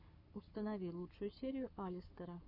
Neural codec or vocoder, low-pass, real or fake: codec, 44.1 kHz, 7.8 kbps, Pupu-Codec; 5.4 kHz; fake